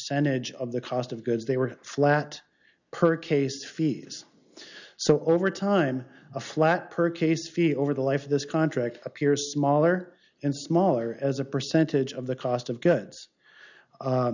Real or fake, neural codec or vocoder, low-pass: real; none; 7.2 kHz